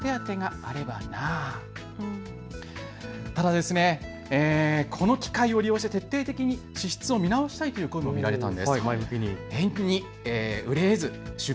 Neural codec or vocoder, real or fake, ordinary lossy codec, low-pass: none; real; none; none